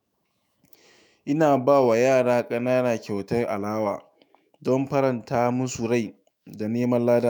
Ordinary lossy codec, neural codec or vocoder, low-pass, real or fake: none; autoencoder, 48 kHz, 128 numbers a frame, DAC-VAE, trained on Japanese speech; none; fake